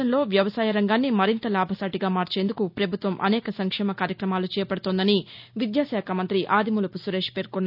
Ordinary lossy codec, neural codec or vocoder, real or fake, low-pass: none; none; real; 5.4 kHz